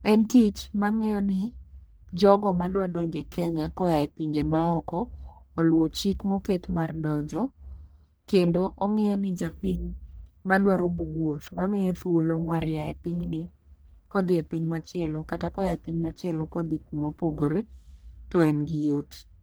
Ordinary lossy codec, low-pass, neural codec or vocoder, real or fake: none; none; codec, 44.1 kHz, 1.7 kbps, Pupu-Codec; fake